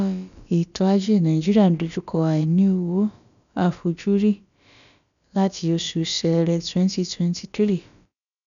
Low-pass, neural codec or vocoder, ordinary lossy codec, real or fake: 7.2 kHz; codec, 16 kHz, about 1 kbps, DyCAST, with the encoder's durations; none; fake